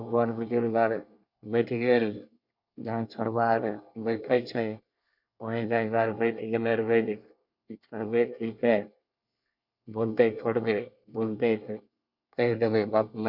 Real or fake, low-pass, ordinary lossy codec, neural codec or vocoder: fake; 5.4 kHz; none; codec, 24 kHz, 1 kbps, SNAC